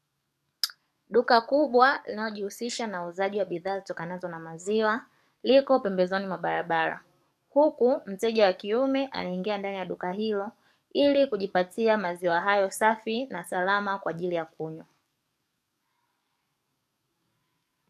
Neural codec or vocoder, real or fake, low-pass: codec, 44.1 kHz, 7.8 kbps, DAC; fake; 14.4 kHz